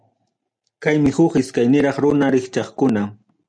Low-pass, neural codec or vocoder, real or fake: 9.9 kHz; none; real